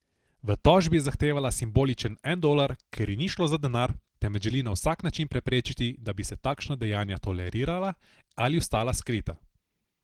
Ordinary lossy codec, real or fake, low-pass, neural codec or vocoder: Opus, 16 kbps; real; 19.8 kHz; none